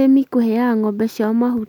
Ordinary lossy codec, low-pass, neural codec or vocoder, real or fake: none; 19.8 kHz; none; real